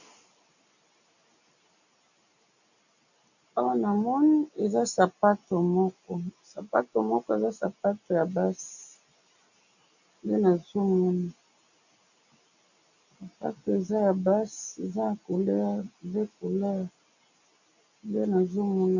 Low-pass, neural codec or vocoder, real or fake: 7.2 kHz; none; real